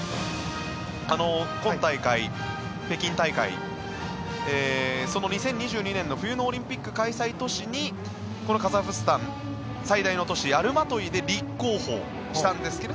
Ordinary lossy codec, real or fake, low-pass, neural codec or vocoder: none; real; none; none